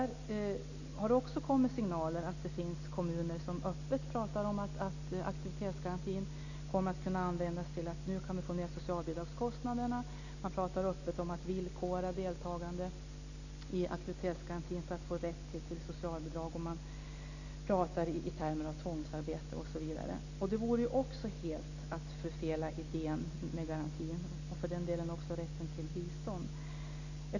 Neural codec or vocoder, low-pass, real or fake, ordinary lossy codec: none; 7.2 kHz; real; none